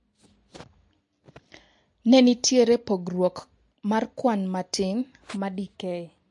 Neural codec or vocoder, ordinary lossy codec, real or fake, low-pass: none; MP3, 48 kbps; real; 10.8 kHz